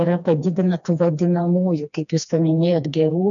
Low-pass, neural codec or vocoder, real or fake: 7.2 kHz; codec, 16 kHz, 2 kbps, FreqCodec, smaller model; fake